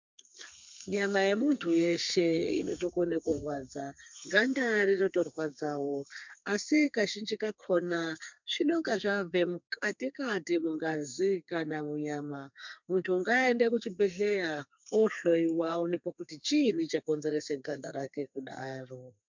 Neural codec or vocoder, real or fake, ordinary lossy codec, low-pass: codec, 32 kHz, 1.9 kbps, SNAC; fake; MP3, 64 kbps; 7.2 kHz